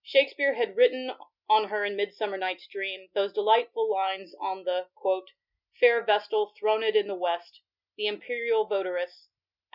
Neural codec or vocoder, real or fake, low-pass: none; real; 5.4 kHz